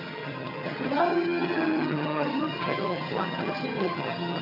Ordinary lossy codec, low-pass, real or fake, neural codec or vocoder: AAC, 48 kbps; 5.4 kHz; fake; vocoder, 22.05 kHz, 80 mel bands, HiFi-GAN